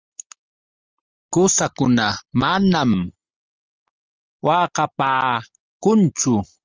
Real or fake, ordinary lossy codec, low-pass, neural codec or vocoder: real; Opus, 24 kbps; 7.2 kHz; none